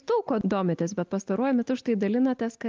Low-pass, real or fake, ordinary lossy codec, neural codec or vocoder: 7.2 kHz; real; Opus, 24 kbps; none